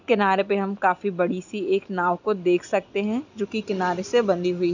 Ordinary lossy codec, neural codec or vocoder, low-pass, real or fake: none; none; 7.2 kHz; real